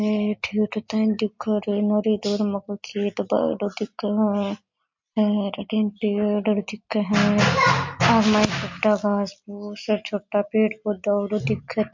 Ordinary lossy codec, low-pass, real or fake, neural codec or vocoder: MP3, 48 kbps; 7.2 kHz; real; none